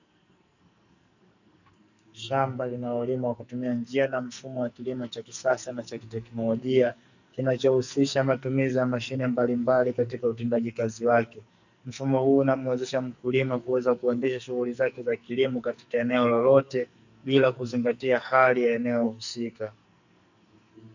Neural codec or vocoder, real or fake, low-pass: codec, 44.1 kHz, 2.6 kbps, SNAC; fake; 7.2 kHz